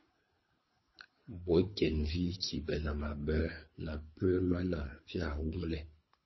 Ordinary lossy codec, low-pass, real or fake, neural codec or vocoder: MP3, 24 kbps; 7.2 kHz; fake; codec, 24 kHz, 3 kbps, HILCodec